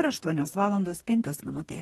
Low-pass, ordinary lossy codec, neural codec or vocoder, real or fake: 19.8 kHz; AAC, 32 kbps; codec, 44.1 kHz, 2.6 kbps, DAC; fake